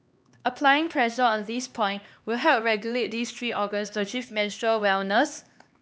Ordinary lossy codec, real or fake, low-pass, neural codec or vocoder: none; fake; none; codec, 16 kHz, 2 kbps, X-Codec, HuBERT features, trained on LibriSpeech